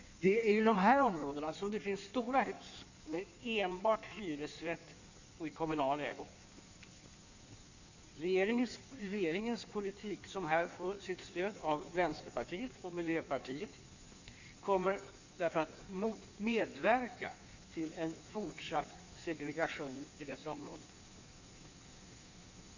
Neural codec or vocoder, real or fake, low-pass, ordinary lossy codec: codec, 16 kHz in and 24 kHz out, 1.1 kbps, FireRedTTS-2 codec; fake; 7.2 kHz; none